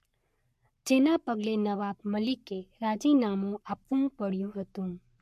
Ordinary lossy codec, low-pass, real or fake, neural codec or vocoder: MP3, 64 kbps; 14.4 kHz; fake; codec, 44.1 kHz, 7.8 kbps, Pupu-Codec